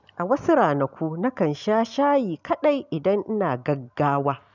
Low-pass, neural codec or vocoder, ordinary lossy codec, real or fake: 7.2 kHz; none; none; real